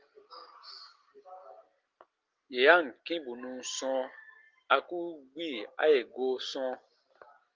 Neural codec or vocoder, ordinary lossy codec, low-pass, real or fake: none; Opus, 32 kbps; 7.2 kHz; real